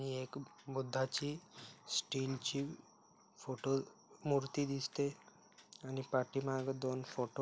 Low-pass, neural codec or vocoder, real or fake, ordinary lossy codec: none; none; real; none